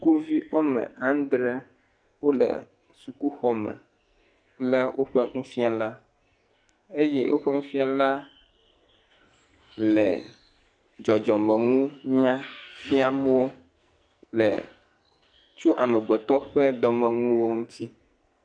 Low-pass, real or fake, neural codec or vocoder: 9.9 kHz; fake; codec, 44.1 kHz, 2.6 kbps, SNAC